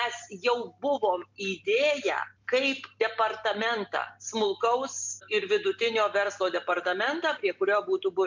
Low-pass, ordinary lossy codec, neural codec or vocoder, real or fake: 7.2 kHz; MP3, 64 kbps; none; real